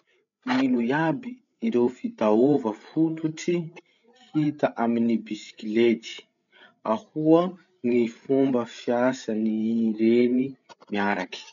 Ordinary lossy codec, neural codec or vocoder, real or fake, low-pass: none; codec, 16 kHz, 8 kbps, FreqCodec, larger model; fake; 7.2 kHz